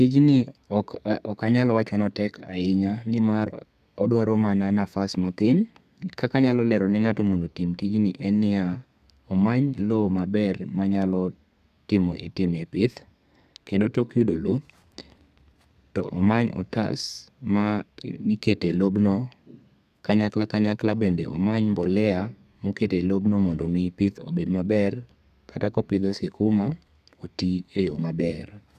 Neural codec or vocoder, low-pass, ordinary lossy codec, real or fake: codec, 32 kHz, 1.9 kbps, SNAC; 14.4 kHz; none; fake